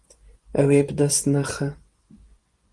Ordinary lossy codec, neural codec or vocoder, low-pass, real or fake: Opus, 24 kbps; vocoder, 24 kHz, 100 mel bands, Vocos; 10.8 kHz; fake